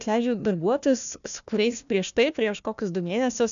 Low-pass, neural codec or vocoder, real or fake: 7.2 kHz; codec, 16 kHz, 1 kbps, FunCodec, trained on Chinese and English, 50 frames a second; fake